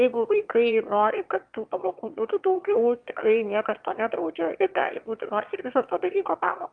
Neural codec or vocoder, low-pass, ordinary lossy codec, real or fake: autoencoder, 22.05 kHz, a latent of 192 numbers a frame, VITS, trained on one speaker; 9.9 kHz; Opus, 32 kbps; fake